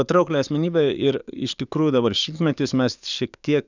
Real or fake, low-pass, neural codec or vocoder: fake; 7.2 kHz; codec, 44.1 kHz, 7.8 kbps, DAC